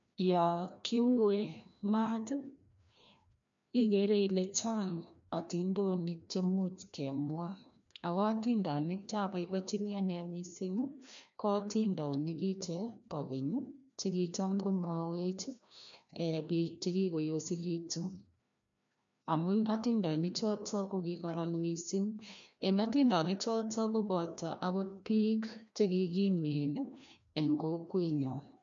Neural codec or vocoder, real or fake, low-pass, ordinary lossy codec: codec, 16 kHz, 1 kbps, FreqCodec, larger model; fake; 7.2 kHz; MP3, 64 kbps